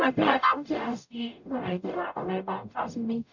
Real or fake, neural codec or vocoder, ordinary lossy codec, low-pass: fake; codec, 44.1 kHz, 0.9 kbps, DAC; none; 7.2 kHz